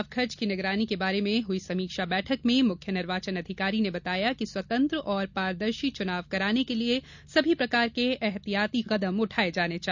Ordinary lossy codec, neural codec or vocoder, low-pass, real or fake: none; none; 7.2 kHz; real